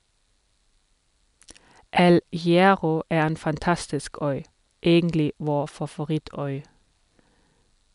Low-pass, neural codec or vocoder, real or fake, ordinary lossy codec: 10.8 kHz; none; real; MP3, 96 kbps